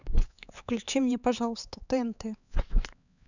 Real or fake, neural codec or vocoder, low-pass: fake; codec, 16 kHz, 4 kbps, X-Codec, HuBERT features, trained on LibriSpeech; 7.2 kHz